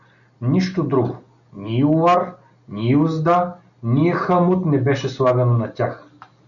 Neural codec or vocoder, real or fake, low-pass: none; real; 7.2 kHz